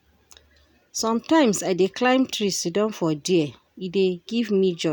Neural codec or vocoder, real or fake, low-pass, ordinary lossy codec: none; real; none; none